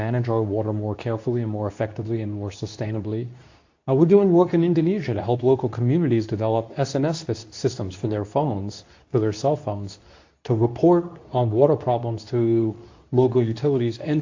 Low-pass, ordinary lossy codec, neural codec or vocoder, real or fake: 7.2 kHz; AAC, 48 kbps; codec, 24 kHz, 0.9 kbps, WavTokenizer, medium speech release version 2; fake